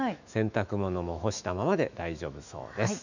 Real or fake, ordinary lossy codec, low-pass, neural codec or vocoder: fake; none; 7.2 kHz; autoencoder, 48 kHz, 128 numbers a frame, DAC-VAE, trained on Japanese speech